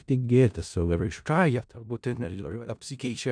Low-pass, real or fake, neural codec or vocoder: 9.9 kHz; fake; codec, 16 kHz in and 24 kHz out, 0.4 kbps, LongCat-Audio-Codec, four codebook decoder